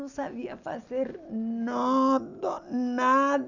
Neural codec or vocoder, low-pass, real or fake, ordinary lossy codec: none; 7.2 kHz; real; none